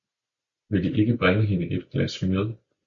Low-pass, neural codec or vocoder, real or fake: 7.2 kHz; none; real